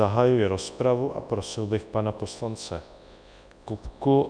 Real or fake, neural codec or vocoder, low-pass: fake; codec, 24 kHz, 0.9 kbps, WavTokenizer, large speech release; 9.9 kHz